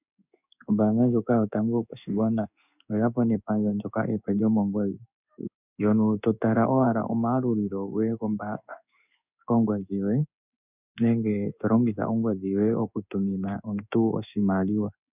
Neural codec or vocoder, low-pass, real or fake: codec, 16 kHz in and 24 kHz out, 1 kbps, XY-Tokenizer; 3.6 kHz; fake